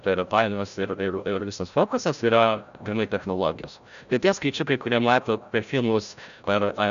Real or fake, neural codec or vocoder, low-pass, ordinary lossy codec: fake; codec, 16 kHz, 0.5 kbps, FreqCodec, larger model; 7.2 kHz; MP3, 96 kbps